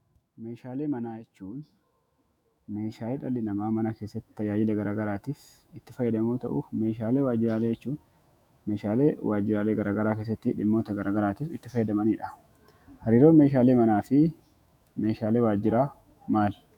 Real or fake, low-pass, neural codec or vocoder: fake; 19.8 kHz; autoencoder, 48 kHz, 128 numbers a frame, DAC-VAE, trained on Japanese speech